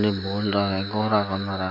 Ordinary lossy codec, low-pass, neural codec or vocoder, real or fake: none; 5.4 kHz; none; real